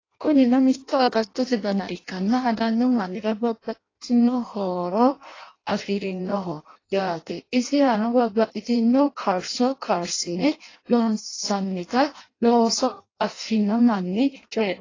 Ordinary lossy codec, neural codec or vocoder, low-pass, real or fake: AAC, 32 kbps; codec, 16 kHz in and 24 kHz out, 0.6 kbps, FireRedTTS-2 codec; 7.2 kHz; fake